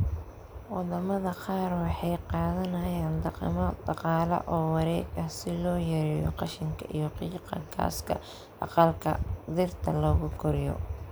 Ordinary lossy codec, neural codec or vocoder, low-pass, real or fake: none; none; none; real